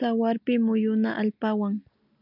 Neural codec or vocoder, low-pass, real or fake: none; 5.4 kHz; real